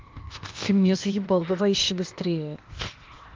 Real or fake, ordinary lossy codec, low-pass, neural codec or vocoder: fake; Opus, 32 kbps; 7.2 kHz; codec, 16 kHz, 0.8 kbps, ZipCodec